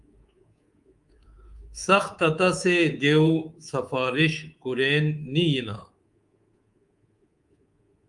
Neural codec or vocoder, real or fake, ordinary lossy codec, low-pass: codec, 24 kHz, 3.1 kbps, DualCodec; fake; Opus, 32 kbps; 10.8 kHz